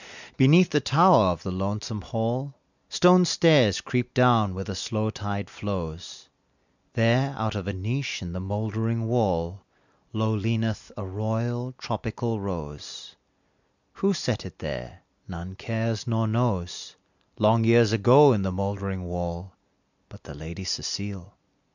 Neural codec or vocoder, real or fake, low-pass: none; real; 7.2 kHz